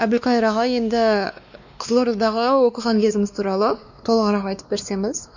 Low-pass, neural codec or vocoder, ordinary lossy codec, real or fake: 7.2 kHz; codec, 16 kHz, 2 kbps, X-Codec, WavLM features, trained on Multilingual LibriSpeech; none; fake